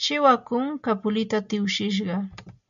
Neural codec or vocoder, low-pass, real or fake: none; 7.2 kHz; real